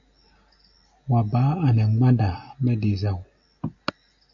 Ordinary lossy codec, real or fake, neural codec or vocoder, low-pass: AAC, 48 kbps; real; none; 7.2 kHz